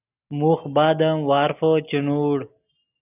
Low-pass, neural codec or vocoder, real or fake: 3.6 kHz; none; real